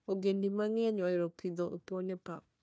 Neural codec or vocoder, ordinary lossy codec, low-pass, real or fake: codec, 16 kHz, 1 kbps, FunCodec, trained on Chinese and English, 50 frames a second; none; none; fake